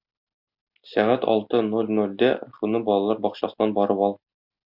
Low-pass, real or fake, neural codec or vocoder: 5.4 kHz; real; none